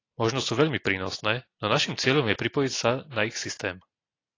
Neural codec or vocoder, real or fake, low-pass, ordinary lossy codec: none; real; 7.2 kHz; AAC, 32 kbps